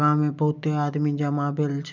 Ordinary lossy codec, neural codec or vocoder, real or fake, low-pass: none; none; real; 7.2 kHz